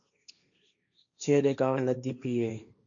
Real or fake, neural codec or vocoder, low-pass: fake; codec, 16 kHz, 1.1 kbps, Voila-Tokenizer; 7.2 kHz